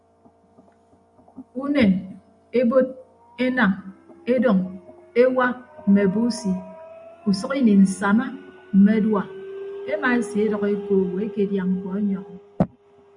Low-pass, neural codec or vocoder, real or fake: 10.8 kHz; none; real